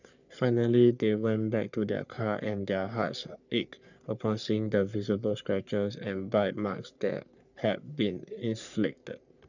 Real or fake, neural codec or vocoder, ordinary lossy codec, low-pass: fake; codec, 44.1 kHz, 3.4 kbps, Pupu-Codec; none; 7.2 kHz